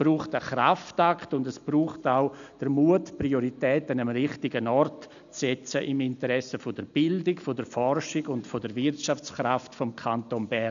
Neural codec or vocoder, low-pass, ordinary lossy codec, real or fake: none; 7.2 kHz; none; real